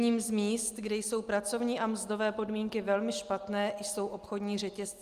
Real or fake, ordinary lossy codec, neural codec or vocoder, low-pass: real; Opus, 32 kbps; none; 14.4 kHz